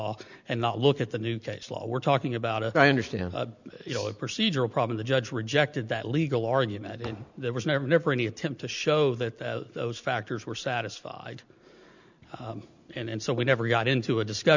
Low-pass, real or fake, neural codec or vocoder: 7.2 kHz; real; none